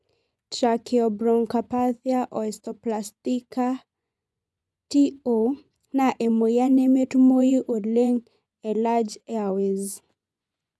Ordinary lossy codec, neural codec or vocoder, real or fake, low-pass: none; vocoder, 24 kHz, 100 mel bands, Vocos; fake; none